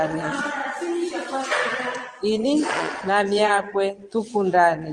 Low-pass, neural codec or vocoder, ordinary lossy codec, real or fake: 9.9 kHz; vocoder, 22.05 kHz, 80 mel bands, Vocos; Opus, 24 kbps; fake